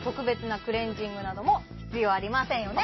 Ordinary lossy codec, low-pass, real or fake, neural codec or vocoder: MP3, 24 kbps; 7.2 kHz; real; none